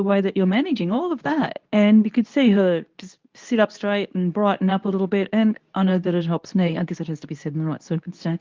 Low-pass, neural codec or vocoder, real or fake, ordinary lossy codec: 7.2 kHz; codec, 24 kHz, 0.9 kbps, WavTokenizer, medium speech release version 2; fake; Opus, 32 kbps